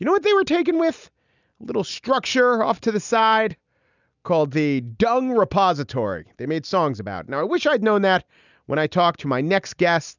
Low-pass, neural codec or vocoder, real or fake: 7.2 kHz; none; real